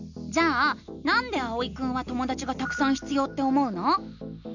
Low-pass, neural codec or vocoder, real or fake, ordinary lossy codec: 7.2 kHz; none; real; none